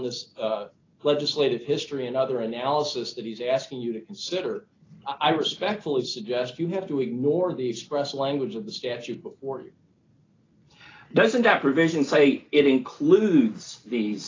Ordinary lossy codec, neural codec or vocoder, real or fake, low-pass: AAC, 32 kbps; none; real; 7.2 kHz